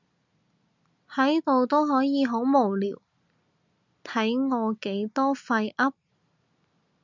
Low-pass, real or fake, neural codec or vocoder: 7.2 kHz; real; none